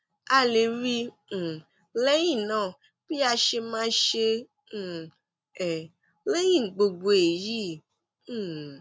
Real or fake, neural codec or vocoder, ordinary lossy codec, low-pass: real; none; none; none